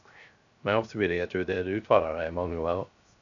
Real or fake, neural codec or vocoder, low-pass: fake; codec, 16 kHz, 0.3 kbps, FocalCodec; 7.2 kHz